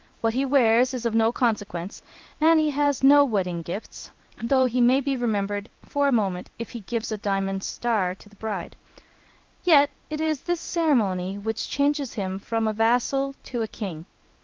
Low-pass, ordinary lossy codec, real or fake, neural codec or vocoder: 7.2 kHz; Opus, 32 kbps; fake; codec, 16 kHz in and 24 kHz out, 1 kbps, XY-Tokenizer